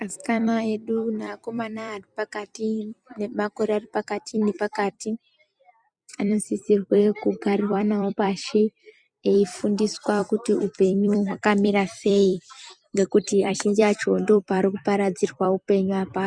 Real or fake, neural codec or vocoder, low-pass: fake; vocoder, 44.1 kHz, 128 mel bands every 256 samples, BigVGAN v2; 9.9 kHz